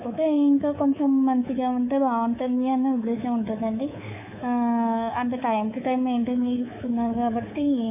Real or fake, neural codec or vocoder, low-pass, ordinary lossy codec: fake; codec, 24 kHz, 3.1 kbps, DualCodec; 3.6 kHz; none